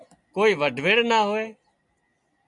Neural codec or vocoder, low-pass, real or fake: none; 10.8 kHz; real